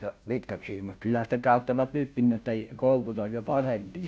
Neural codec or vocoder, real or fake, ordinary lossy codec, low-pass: codec, 16 kHz, 0.5 kbps, FunCodec, trained on Chinese and English, 25 frames a second; fake; none; none